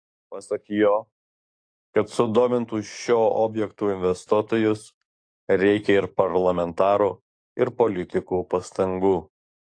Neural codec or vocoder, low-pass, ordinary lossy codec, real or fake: codec, 44.1 kHz, 7.8 kbps, DAC; 9.9 kHz; AAC, 48 kbps; fake